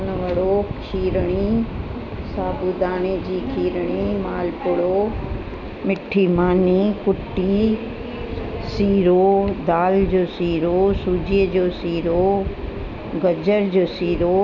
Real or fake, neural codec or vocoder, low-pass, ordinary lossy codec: real; none; 7.2 kHz; none